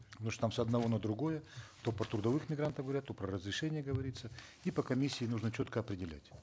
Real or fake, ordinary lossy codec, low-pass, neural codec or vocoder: real; none; none; none